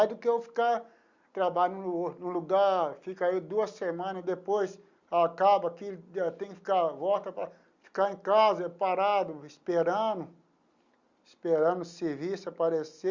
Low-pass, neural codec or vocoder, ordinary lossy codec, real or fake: 7.2 kHz; none; Opus, 64 kbps; real